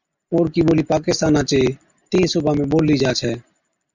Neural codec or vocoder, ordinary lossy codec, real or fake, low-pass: none; Opus, 64 kbps; real; 7.2 kHz